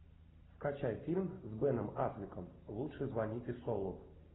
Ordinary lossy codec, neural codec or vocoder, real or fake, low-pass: AAC, 16 kbps; none; real; 7.2 kHz